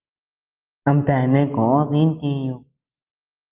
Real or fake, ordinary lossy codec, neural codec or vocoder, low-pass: real; Opus, 32 kbps; none; 3.6 kHz